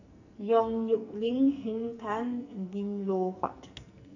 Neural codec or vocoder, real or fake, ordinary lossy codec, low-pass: codec, 44.1 kHz, 2.6 kbps, SNAC; fake; none; 7.2 kHz